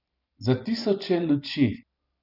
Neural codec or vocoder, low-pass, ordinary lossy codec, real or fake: vocoder, 44.1 kHz, 128 mel bands every 512 samples, BigVGAN v2; 5.4 kHz; none; fake